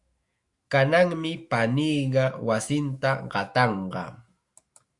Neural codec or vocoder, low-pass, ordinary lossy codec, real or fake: autoencoder, 48 kHz, 128 numbers a frame, DAC-VAE, trained on Japanese speech; 10.8 kHz; Opus, 64 kbps; fake